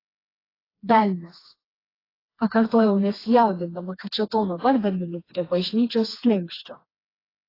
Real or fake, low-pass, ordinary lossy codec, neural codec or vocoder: fake; 5.4 kHz; AAC, 24 kbps; codec, 16 kHz, 2 kbps, FreqCodec, smaller model